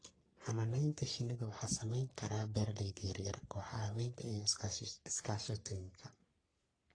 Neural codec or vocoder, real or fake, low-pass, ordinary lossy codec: codec, 44.1 kHz, 3.4 kbps, Pupu-Codec; fake; 9.9 kHz; AAC, 32 kbps